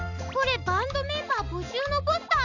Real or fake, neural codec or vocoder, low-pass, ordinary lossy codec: real; none; 7.2 kHz; none